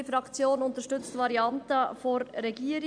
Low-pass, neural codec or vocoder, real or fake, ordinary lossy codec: 9.9 kHz; none; real; none